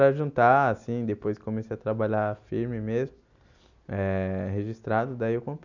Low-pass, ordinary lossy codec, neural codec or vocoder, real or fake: 7.2 kHz; none; none; real